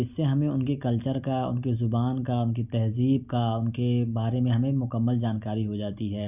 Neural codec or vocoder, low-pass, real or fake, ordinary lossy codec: none; 3.6 kHz; real; none